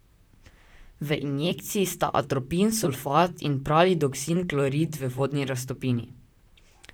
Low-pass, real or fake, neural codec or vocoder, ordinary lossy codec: none; fake; vocoder, 44.1 kHz, 128 mel bands, Pupu-Vocoder; none